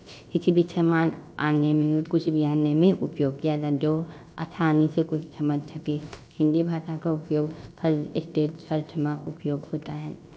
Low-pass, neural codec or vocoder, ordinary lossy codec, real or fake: none; codec, 16 kHz, about 1 kbps, DyCAST, with the encoder's durations; none; fake